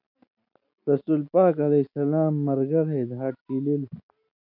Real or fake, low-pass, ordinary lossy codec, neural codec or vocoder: real; 5.4 kHz; MP3, 48 kbps; none